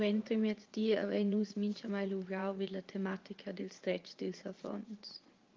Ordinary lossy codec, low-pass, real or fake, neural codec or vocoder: Opus, 24 kbps; 7.2 kHz; fake; vocoder, 44.1 kHz, 80 mel bands, Vocos